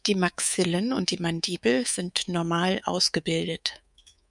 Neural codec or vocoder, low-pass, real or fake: codec, 24 kHz, 3.1 kbps, DualCodec; 10.8 kHz; fake